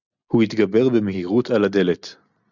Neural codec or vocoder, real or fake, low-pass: none; real; 7.2 kHz